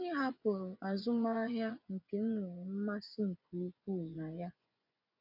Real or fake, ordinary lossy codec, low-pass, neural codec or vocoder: fake; none; 5.4 kHz; vocoder, 24 kHz, 100 mel bands, Vocos